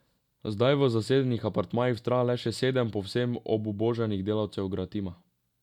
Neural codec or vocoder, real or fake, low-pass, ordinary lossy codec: none; real; 19.8 kHz; none